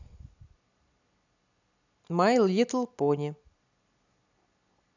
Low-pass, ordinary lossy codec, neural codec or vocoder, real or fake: 7.2 kHz; none; none; real